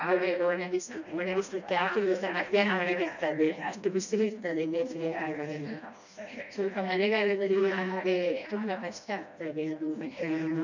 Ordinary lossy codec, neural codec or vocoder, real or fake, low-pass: none; codec, 16 kHz, 1 kbps, FreqCodec, smaller model; fake; 7.2 kHz